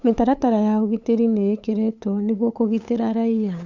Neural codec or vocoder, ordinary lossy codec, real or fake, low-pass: codec, 16 kHz, 8 kbps, FunCodec, trained on LibriTTS, 25 frames a second; Opus, 64 kbps; fake; 7.2 kHz